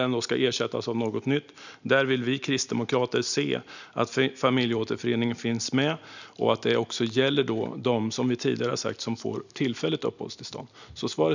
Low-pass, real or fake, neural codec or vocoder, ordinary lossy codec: 7.2 kHz; real; none; none